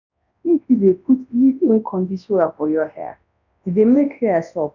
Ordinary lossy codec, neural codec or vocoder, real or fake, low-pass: none; codec, 24 kHz, 0.9 kbps, WavTokenizer, large speech release; fake; 7.2 kHz